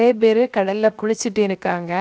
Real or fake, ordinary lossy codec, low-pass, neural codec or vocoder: fake; none; none; codec, 16 kHz, 0.7 kbps, FocalCodec